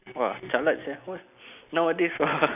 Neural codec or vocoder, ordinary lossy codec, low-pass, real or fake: none; none; 3.6 kHz; real